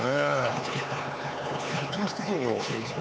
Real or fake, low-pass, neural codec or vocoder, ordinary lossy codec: fake; none; codec, 16 kHz, 4 kbps, X-Codec, HuBERT features, trained on LibriSpeech; none